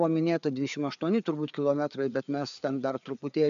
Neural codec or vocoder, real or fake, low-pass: codec, 16 kHz, 4 kbps, FreqCodec, larger model; fake; 7.2 kHz